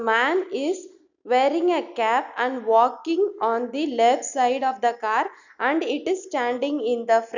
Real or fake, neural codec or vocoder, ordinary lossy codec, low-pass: real; none; none; 7.2 kHz